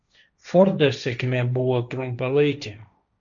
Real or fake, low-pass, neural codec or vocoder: fake; 7.2 kHz; codec, 16 kHz, 1.1 kbps, Voila-Tokenizer